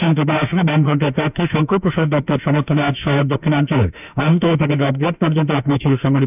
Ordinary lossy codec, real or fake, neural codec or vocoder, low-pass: none; fake; autoencoder, 48 kHz, 32 numbers a frame, DAC-VAE, trained on Japanese speech; 3.6 kHz